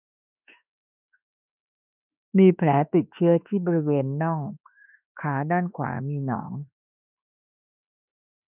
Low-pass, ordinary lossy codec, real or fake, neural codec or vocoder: 3.6 kHz; none; fake; autoencoder, 48 kHz, 32 numbers a frame, DAC-VAE, trained on Japanese speech